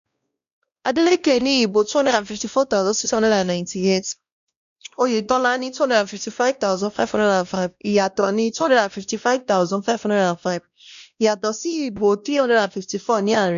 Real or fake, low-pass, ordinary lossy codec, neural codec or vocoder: fake; 7.2 kHz; MP3, 96 kbps; codec, 16 kHz, 1 kbps, X-Codec, WavLM features, trained on Multilingual LibriSpeech